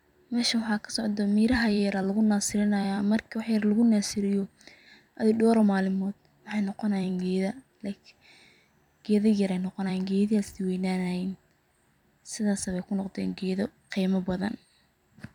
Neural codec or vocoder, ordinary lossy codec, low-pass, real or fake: vocoder, 44.1 kHz, 128 mel bands every 256 samples, BigVGAN v2; none; 19.8 kHz; fake